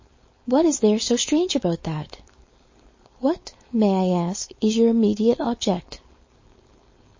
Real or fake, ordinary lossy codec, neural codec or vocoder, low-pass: fake; MP3, 32 kbps; codec, 16 kHz, 4.8 kbps, FACodec; 7.2 kHz